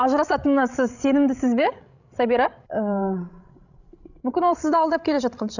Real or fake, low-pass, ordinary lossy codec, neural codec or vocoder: fake; 7.2 kHz; none; codec, 16 kHz, 16 kbps, FreqCodec, larger model